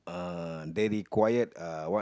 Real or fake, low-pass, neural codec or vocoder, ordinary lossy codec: real; none; none; none